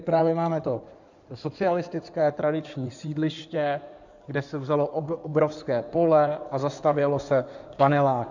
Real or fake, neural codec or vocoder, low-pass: fake; codec, 16 kHz in and 24 kHz out, 2.2 kbps, FireRedTTS-2 codec; 7.2 kHz